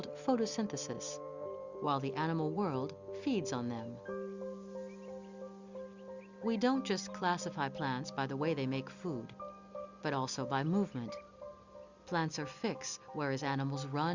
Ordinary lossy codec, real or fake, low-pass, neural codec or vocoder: Opus, 64 kbps; real; 7.2 kHz; none